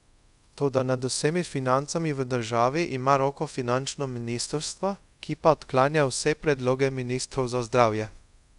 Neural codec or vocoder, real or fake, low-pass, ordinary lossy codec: codec, 24 kHz, 0.5 kbps, DualCodec; fake; 10.8 kHz; none